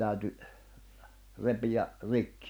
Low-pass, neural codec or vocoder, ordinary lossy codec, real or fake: none; none; none; real